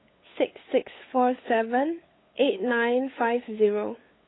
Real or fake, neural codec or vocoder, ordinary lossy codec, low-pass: fake; codec, 16 kHz, 8 kbps, FunCodec, trained on LibriTTS, 25 frames a second; AAC, 16 kbps; 7.2 kHz